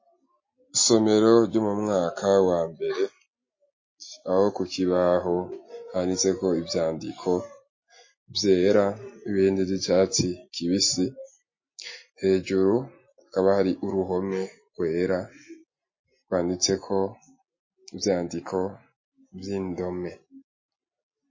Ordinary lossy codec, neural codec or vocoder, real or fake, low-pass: MP3, 32 kbps; none; real; 7.2 kHz